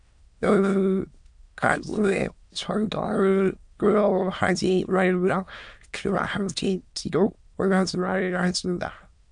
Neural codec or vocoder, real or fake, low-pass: autoencoder, 22.05 kHz, a latent of 192 numbers a frame, VITS, trained on many speakers; fake; 9.9 kHz